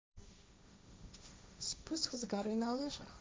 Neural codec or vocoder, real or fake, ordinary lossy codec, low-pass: codec, 16 kHz, 1.1 kbps, Voila-Tokenizer; fake; none; none